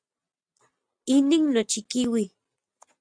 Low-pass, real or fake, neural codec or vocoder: 9.9 kHz; real; none